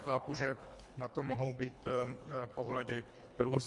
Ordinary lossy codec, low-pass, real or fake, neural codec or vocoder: MP3, 64 kbps; 10.8 kHz; fake; codec, 24 kHz, 1.5 kbps, HILCodec